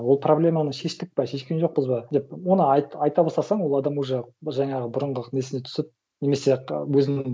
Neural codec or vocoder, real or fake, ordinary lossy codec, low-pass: none; real; none; none